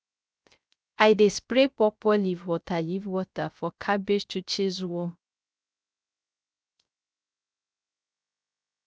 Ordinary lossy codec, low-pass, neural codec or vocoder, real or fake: none; none; codec, 16 kHz, 0.3 kbps, FocalCodec; fake